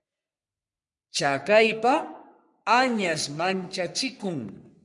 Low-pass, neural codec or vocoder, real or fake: 10.8 kHz; codec, 44.1 kHz, 3.4 kbps, Pupu-Codec; fake